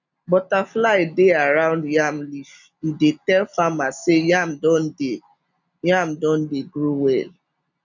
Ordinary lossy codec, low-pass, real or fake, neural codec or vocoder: none; 7.2 kHz; real; none